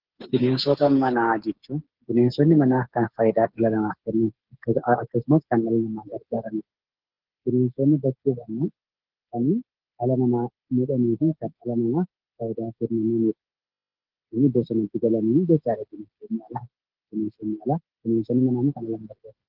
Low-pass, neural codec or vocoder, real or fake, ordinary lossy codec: 5.4 kHz; codec, 16 kHz, 8 kbps, FreqCodec, smaller model; fake; Opus, 16 kbps